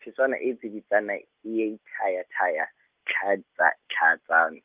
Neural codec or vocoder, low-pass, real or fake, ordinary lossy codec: none; 3.6 kHz; real; Opus, 32 kbps